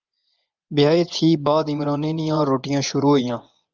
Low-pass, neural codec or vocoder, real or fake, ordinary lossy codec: 7.2 kHz; vocoder, 24 kHz, 100 mel bands, Vocos; fake; Opus, 32 kbps